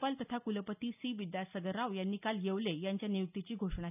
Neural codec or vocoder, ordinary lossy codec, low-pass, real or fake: none; none; 3.6 kHz; real